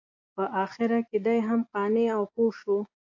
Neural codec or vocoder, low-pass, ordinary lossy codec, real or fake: vocoder, 24 kHz, 100 mel bands, Vocos; 7.2 kHz; AAC, 48 kbps; fake